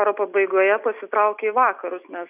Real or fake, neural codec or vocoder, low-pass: real; none; 3.6 kHz